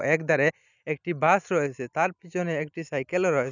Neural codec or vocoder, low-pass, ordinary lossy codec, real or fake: none; 7.2 kHz; none; real